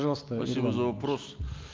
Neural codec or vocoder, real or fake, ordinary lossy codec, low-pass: none; real; Opus, 32 kbps; 7.2 kHz